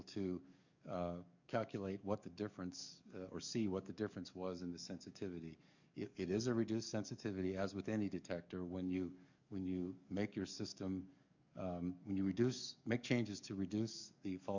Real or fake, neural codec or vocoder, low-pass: fake; codec, 44.1 kHz, 7.8 kbps, DAC; 7.2 kHz